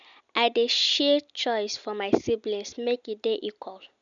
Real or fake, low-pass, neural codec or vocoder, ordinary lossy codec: real; 7.2 kHz; none; none